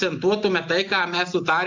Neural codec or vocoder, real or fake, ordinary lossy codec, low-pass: none; real; AAC, 48 kbps; 7.2 kHz